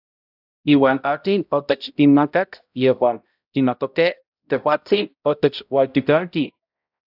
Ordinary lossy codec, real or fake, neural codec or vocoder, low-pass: AAC, 48 kbps; fake; codec, 16 kHz, 0.5 kbps, X-Codec, HuBERT features, trained on balanced general audio; 5.4 kHz